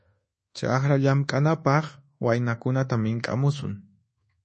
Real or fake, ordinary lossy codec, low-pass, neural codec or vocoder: fake; MP3, 32 kbps; 10.8 kHz; codec, 24 kHz, 1.2 kbps, DualCodec